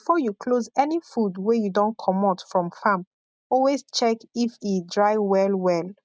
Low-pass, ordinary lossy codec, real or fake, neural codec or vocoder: none; none; real; none